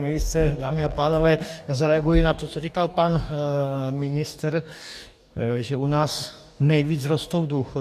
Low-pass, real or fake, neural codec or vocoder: 14.4 kHz; fake; codec, 44.1 kHz, 2.6 kbps, DAC